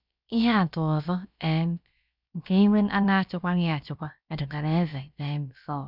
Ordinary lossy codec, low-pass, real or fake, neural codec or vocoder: none; 5.4 kHz; fake; codec, 16 kHz, about 1 kbps, DyCAST, with the encoder's durations